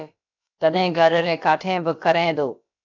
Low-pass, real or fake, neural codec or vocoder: 7.2 kHz; fake; codec, 16 kHz, about 1 kbps, DyCAST, with the encoder's durations